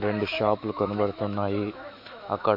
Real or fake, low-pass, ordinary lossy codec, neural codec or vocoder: fake; 5.4 kHz; MP3, 48 kbps; autoencoder, 48 kHz, 128 numbers a frame, DAC-VAE, trained on Japanese speech